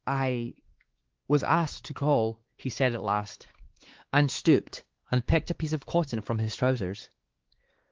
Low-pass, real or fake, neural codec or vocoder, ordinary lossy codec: 7.2 kHz; fake; codec, 16 kHz, 2 kbps, X-Codec, WavLM features, trained on Multilingual LibriSpeech; Opus, 16 kbps